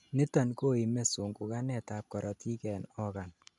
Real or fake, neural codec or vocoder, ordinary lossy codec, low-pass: real; none; none; 10.8 kHz